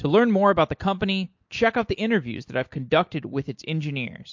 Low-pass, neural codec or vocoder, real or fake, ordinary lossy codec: 7.2 kHz; none; real; MP3, 48 kbps